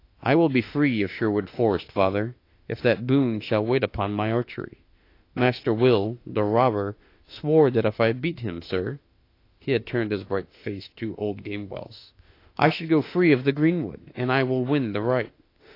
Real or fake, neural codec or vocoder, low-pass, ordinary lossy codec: fake; autoencoder, 48 kHz, 32 numbers a frame, DAC-VAE, trained on Japanese speech; 5.4 kHz; AAC, 32 kbps